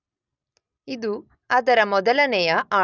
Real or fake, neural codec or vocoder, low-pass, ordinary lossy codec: real; none; 7.2 kHz; none